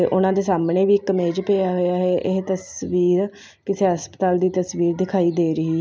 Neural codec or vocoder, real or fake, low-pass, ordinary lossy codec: none; real; none; none